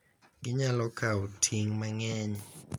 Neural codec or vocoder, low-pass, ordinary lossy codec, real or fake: none; none; none; real